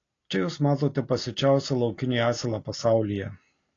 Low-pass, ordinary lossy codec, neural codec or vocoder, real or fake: 7.2 kHz; AAC, 32 kbps; none; real